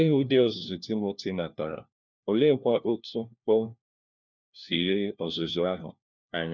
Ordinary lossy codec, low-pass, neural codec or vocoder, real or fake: none; 7.2 kHz; codec, 16 kHz, 1 kbps, FunCodec, trained on LibriTTS, 50 frames a second; fake